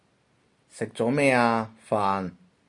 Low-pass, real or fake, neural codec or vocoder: 10.8 kHz; real; none